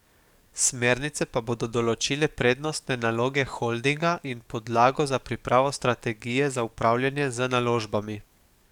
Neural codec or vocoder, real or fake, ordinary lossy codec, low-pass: codec, 44.1 kHz, 7.8 kbps, DAC; fake; none; 19.8 kHz